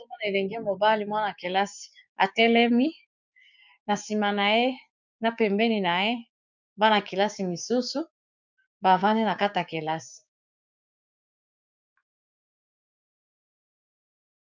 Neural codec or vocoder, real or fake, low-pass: codec, 16 kHz, 6 kbps, DAC; fake; 7.2 kHz